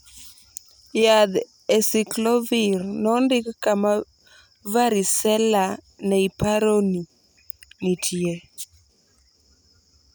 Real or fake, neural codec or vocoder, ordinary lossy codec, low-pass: real; none; none; none